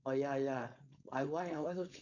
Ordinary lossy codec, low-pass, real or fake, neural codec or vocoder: none; 7.2 kHz; fake; codec, 16 kHz, 4.8 kbps, FACodec